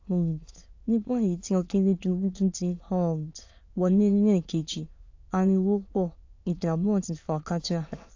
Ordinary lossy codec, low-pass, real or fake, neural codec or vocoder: AAC, 48 kbps; 7.2 kHz; fake; autoencoder, 22.05 kHz, a latent of 192 numbers a frame, VITS, trained on many speakers